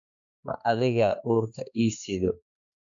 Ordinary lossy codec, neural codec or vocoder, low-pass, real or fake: none; codec, 16 kHz, 2 kbps, X-Codec, HuBERT features, trained on balanced general audio; 7.2 kHz; fake